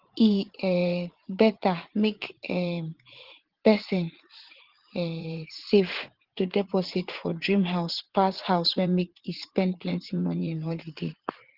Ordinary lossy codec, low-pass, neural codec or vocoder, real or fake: Opus, 16 kbps; 5.4 kHz; vocoder, 44.1 kHz, 80 mel bands, Vocos; fake